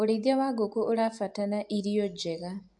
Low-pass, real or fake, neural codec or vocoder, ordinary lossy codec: 10.8 kHz; real; none; AAC, 64 kbps